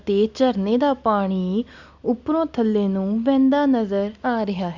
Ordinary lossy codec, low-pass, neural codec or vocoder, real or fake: Opus, 64 kbps; 7.2 kHz; none; real